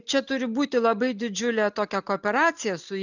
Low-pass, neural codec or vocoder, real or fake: 7.2 kHz; none; real